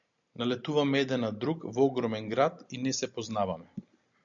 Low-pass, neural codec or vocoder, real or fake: 7.2 kHz; none; real